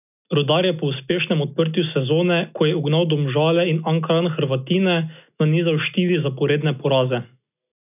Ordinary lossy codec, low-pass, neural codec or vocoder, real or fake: none; 3.6 kHz; none; real